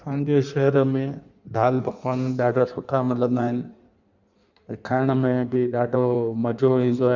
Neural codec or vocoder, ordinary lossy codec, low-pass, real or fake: codec, 16 kHz in and 24 kHz out, 1.1 kbps, FireRedTTS-2 codec; none; 7.2 kHz; fake